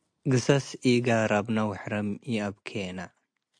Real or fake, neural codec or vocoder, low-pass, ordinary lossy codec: real; none; 9.9 kHz; AAC, 64 kbps